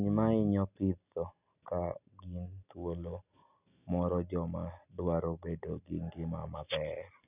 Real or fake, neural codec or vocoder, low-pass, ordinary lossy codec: real; none; 3.6 kHz; none